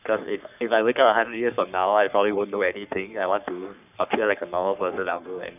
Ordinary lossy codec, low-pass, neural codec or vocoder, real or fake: none; 3.6 kHz; codec, 44.1 kHz, 3.4 kbps, Pupu-Codec; fake